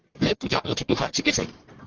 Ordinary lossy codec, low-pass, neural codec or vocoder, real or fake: Opus, 16 kbps; 7.2 kHz; codec, 44.1 kHz, 1.7 kbps, Pupu-Codec; fake